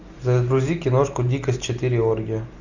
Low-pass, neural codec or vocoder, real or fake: 7.2 kHz; none; real